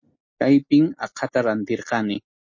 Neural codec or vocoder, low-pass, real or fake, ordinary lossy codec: none; 7.2 kHz; real; MP3, 32 kbps